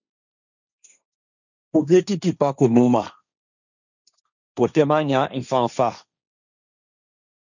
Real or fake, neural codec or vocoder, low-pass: fake; codec, 16 kHz, 1.1 kbps, Voila-Tokenizer; 7.2 kHz